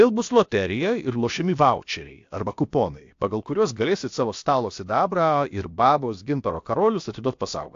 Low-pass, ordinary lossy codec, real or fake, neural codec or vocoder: 7.2 kHz; AAC, 48 kbps; fake; codec, 16 kHz, about 1 kbps, DyCAST, with the encoder's durations